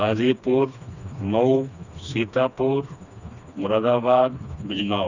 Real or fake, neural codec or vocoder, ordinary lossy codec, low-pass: fake; codec, 16 kHz, 2 kbps, FreqCodec, smaller model; none; 7.2 kHz